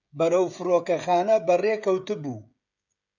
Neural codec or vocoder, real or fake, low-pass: codec, 16 kHz, 16 kbps, FreqCodec, smaller model; fake; 7.2 kHz